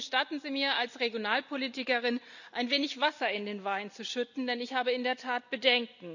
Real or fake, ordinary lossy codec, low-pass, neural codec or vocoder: real; none; 7.2 kHz; none